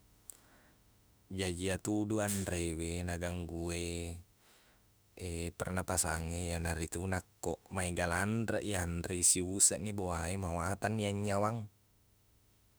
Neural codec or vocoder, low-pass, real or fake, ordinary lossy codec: autoencoder, 48 kHz, 32 numbers a frame, DAC-VAE, trained on Japanese speech; none; fake; none